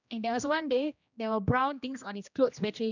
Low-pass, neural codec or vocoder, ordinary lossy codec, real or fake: 7.2 kHz; codec, 16 kHz, 1 kbps, X-Codec, HuBERT features, trained on general audio; none; fake